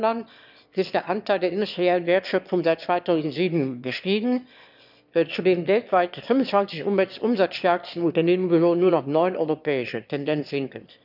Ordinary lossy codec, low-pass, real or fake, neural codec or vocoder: none; 5.4 kHz; fake; autoencoder, 22.05 kHz, a latent of 192 numbers a frame, VITS, trained on one speaker